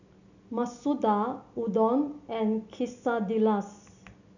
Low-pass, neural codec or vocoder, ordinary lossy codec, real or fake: 7.2 kHz; none; none; real